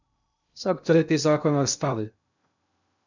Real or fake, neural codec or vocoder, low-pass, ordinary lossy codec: fake; codec, 16 kHz in and 24 kHz out, 0.6 kbps, FocalCodec, streaming, 2048 codes; 7.2 kHz; none